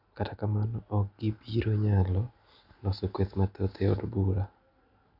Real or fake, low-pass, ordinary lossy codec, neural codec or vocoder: real; 5.4 kHz; none; none